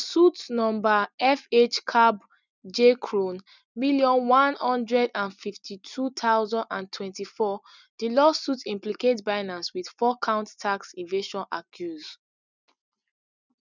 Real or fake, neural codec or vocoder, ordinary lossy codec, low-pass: real; none; none; 7.2 kHz